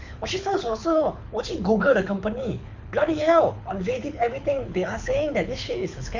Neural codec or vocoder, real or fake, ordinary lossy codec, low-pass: codec, 24 kHz, 6 kbps, HILCodec; fake; MP3, 64 kbps; 7.2 kHz